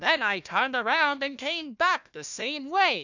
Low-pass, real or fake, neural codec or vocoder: 7.2 kHz; fake; codec, 16 kHz, 1 kbps, FunCodec, trained on Chinese and English, 50 frames a second